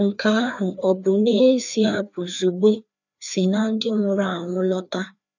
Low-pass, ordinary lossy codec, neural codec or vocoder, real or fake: 7.2 kHz; none; codec, 16 kHz, 2 kbps, FreqCodec, larger model; fake